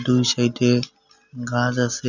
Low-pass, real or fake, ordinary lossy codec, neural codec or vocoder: 7.2 kHz; real; none; none